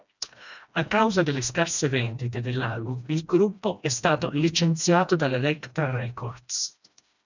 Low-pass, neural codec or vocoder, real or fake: 7.2 kHz; codec, 16 kHz, 1 kbps, FreqCodec, smaller model; fake